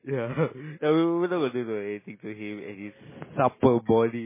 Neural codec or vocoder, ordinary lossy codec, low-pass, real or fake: none; MP3, 16 kbps; 3.6 kHz; real